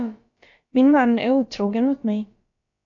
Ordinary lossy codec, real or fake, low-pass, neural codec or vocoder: AAC, 64 kbps; fake; 7.2 kHz; codec, 16 kHz, about 1 kbps, DyCAST, with the encoder's durations